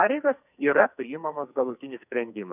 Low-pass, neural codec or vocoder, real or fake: 3.6 kHz; codec, 44.1 kHz, 2.6 kbps, SNAC; fake